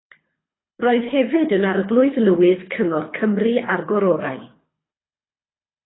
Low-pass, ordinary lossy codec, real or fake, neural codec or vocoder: 7.2 kHz; AAC, 16 kbps; fake; codec, 24 kHz, 3 kbps, HILCodec